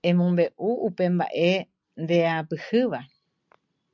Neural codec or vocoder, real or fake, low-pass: none; real; 7.2 kHz